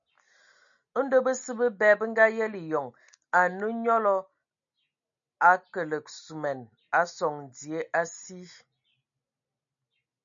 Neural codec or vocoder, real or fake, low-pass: none; real; 7.2 kHz